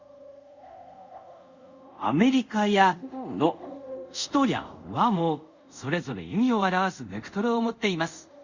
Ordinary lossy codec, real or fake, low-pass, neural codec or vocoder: Opus, 64 kbps; fake; 7.2 kHz; codec, 24 kHz, 0.5 kbps, DualCodec